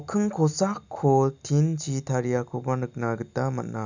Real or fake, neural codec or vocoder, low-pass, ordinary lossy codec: real; none; 7.2 kHz; none